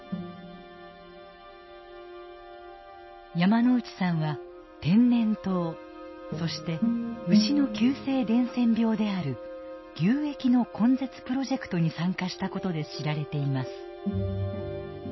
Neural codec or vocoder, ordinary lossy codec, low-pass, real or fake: none; MP3, 24 kbps; 7.2 kHz; real